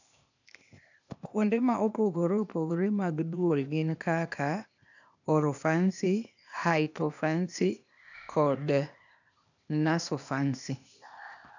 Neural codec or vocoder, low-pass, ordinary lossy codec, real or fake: codec, 16 kHz, 0.8 kbps, ZipCodec; 7.2 kHz; none; fake